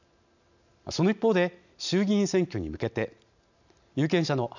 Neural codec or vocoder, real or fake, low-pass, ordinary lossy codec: none; real; 7.2 kHz; none